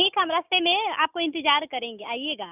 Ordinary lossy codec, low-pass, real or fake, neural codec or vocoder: none; 3.6 kHz; real; none